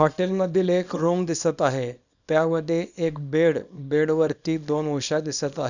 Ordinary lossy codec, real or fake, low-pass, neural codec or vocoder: none; fake; 7.2 kHz; codec, 24 kHz, 0.9 kbps, WavTokenizer, small release